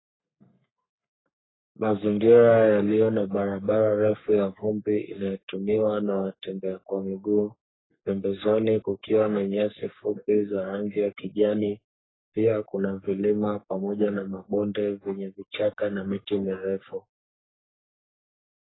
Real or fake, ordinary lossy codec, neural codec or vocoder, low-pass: fake; AAC, 16 kbps; codec, 44.1 kHz, 3.4 kbps, Pupu-Codec; 7.2 kHz